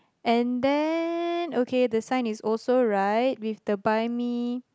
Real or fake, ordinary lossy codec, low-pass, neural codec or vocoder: real; none; none; none